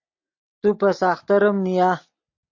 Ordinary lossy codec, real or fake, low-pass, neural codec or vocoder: MP3, 48 kbps; real; 7.2 kHz; none